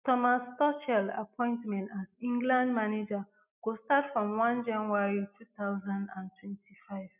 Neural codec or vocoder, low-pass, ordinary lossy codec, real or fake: none; 3.6 kHz; none; real